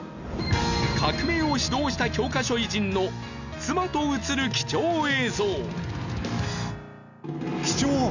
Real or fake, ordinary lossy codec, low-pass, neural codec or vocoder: real; none; 7.2 kHz; none